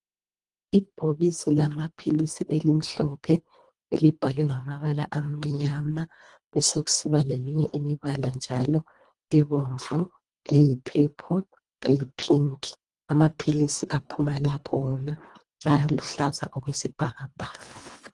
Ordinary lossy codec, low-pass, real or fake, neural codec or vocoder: Opus, 24 kbps; 10.8 kHz; fake; codec, 24 kHz, 1.5 kbps, HILCodec